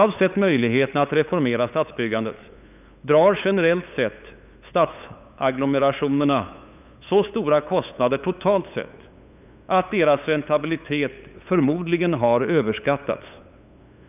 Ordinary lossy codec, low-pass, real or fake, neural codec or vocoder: none; 3.6 kHz; fake; codec, 16 kHz, 8 kbps, FunCodec, trained on LibriTTS, 25 frames a second